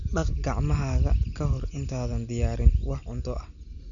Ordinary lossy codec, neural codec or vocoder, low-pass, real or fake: none; none; 7.2 kHz; real